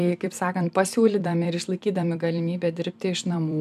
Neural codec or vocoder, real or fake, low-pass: vocoder, 44.1 kHz, 128 mel bands every 256 samples, BigVGAN v2; fake; 14.4 kHz